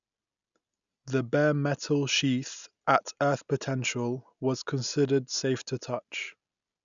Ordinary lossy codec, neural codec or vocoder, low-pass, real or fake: none; none; 7.2 kHz; real